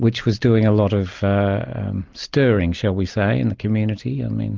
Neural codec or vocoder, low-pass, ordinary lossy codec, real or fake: none; 7.2 kHz; Opus, 32 kbps; real